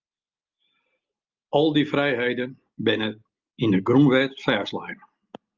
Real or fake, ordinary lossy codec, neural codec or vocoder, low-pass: real; Opus, 24 kbps; none; 7.2 kHz